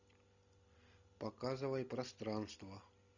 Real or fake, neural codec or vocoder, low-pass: real; none; 7.2 kHz